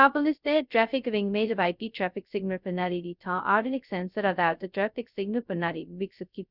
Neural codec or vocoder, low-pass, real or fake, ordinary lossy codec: codec, 16 kHz, 0.2 kbps, FocalCodec; 5.4 kHz; fake; none